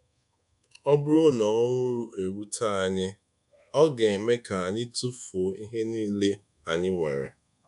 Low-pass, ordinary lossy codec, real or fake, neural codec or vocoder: 10.8 kHz; none; fake; codec, 24 kHz, 1.2 kbps, DualCodec